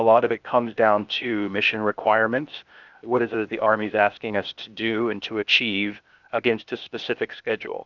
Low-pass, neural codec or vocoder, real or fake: 7.2 kHz; codec, 16 kHz, 0.8 kbps, ZipCodec; fake